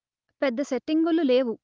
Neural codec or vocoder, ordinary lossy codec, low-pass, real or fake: none; Opus, 32 kbps; 7.2 kHz; real